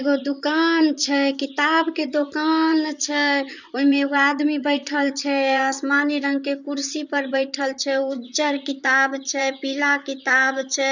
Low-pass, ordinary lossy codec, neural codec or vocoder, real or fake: 7.2 kHz; none; vocoder, 44.1 kHz, 128 mel bands, Pupu-Vocoder; fake